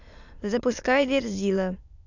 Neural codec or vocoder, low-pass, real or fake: autoencoder, 22.05 kHz, a latent of 192 numbers a frame, VITS, trained on many speakers; 7.2 kHz; fake